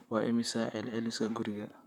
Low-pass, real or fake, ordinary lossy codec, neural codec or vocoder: 19.8 kHz; fake; none; vocoder, 44.1 kHz, 128 mel bands, Pupu-Vocoder